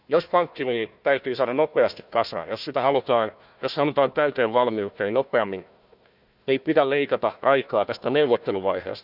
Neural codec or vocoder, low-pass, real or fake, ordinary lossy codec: codec, 16 kHz, 1 kbps, FunCodec, trained on Chinese and English, 50 frames a second; 5.4 kHz; fake; none